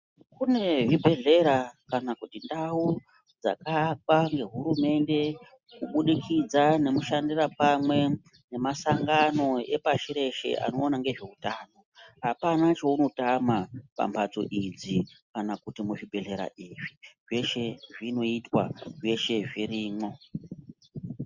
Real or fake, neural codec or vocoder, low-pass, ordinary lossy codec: real; none; 7.2 kHz; Opus, 64 kbps